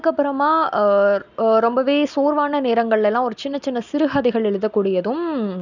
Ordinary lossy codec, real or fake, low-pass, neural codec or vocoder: none; real; 7.2 kHz; none